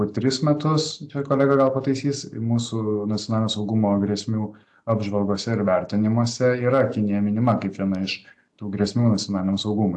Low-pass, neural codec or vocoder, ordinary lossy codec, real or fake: 10.8 kHz; none; AAC, 64 kbps; real